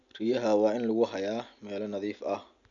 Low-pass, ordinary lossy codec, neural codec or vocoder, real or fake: 7.2 kHz; none; none; real